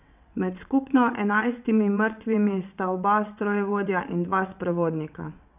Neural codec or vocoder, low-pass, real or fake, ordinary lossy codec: vocoder, 22.05 kHz, 80 mel bands, WaveNeXt; 3.6 kHz; fake; none